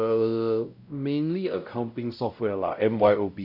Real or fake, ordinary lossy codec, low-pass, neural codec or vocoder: fake; none; 5.4 kHz; codec, 16 kHz, 0.5 kbps, X-Codec, WavLM features, trained on Multilingual LibriSpeech